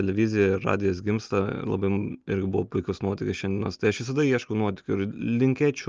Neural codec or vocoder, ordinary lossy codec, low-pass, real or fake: none; Opus, 24 kbps; 7.2 kHz; real